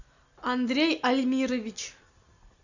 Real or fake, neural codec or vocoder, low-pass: real; none; 7.2 kHz